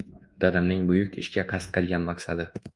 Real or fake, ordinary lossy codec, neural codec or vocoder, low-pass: fake; Opus, 32 kbps; codec, 24 kHz, 1.2 kbps, DualCodec; 10.8 kHz